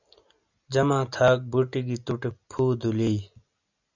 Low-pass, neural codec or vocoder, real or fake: 7.2 kHz; none; real